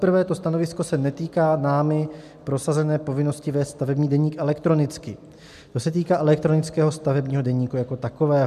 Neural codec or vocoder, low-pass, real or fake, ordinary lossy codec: none; 14.4 kHz; real; MP3, 96 kbps